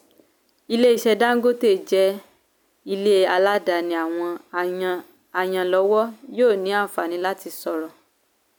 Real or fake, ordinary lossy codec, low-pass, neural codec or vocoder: real; none; none; none